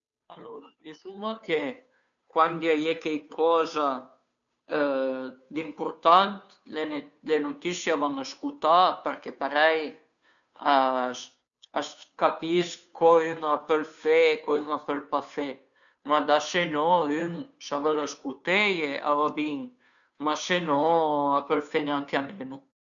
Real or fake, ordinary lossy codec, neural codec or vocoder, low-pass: fake; none; codec, 16 kHz, 2 kbps, FunCodec, trained on Chinese and English, 25 frames a second; 7.2 kHz